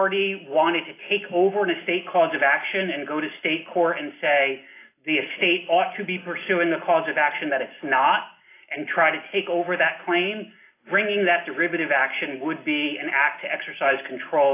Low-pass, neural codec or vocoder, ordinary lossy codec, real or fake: 3.6 kHz; none; AAC, 24 kbps; real